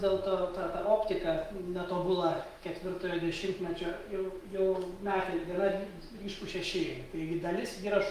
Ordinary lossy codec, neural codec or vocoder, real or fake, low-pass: Opus, 24 kbps; autoencoder, 48 kHz, 128 numbers a frame, DAC-VAE, trained on Japanese speech; fake; 14.4 kHz